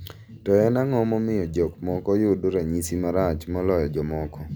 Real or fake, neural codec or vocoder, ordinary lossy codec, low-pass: real; none; none; none